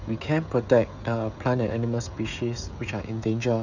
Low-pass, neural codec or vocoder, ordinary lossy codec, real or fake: 7.2 kHz; codec, 16 kHz, 16 kbps, FreqCodec, smaller model; none; fake